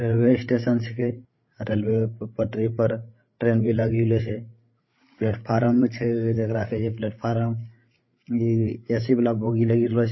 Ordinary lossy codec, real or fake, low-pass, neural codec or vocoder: MP3, 24 kbps; fake; 7.2 kHz; codec, 16 kHz, 8 kbps, FreqCodec, larger model